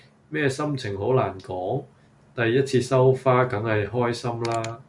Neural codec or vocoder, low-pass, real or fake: none; 10.8 kHz; real